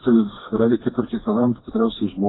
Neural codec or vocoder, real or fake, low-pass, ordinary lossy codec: codec, 16 kHz, 2 kbps, FreqCodec, smaller model; fake; 7.2 kHz; AAC, 16 kbps